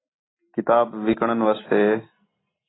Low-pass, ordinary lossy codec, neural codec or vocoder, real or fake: 7.2 kHz; AAC, 16 kbps; none; real